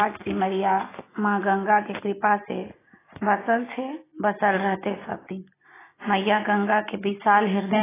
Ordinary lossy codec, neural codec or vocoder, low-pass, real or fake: AAC, 16 kbps; none; 3.6 kHz; real